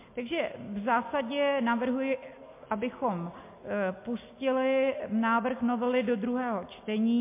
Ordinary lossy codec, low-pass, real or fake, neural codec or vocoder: MP3, 24 kbps; 3.6 kHz; real; none